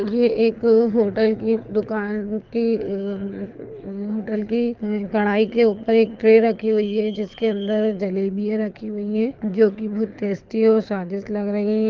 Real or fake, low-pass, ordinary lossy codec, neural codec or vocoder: fake; 7.2 kHz; Opus, 32 kbps; codec, 24 kHz, 6 kbps, HILCodec